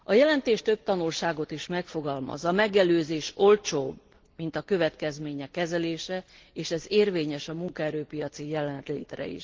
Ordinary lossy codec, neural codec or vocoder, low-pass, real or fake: Opus, 16 kbps; none; 7.2 kHz; real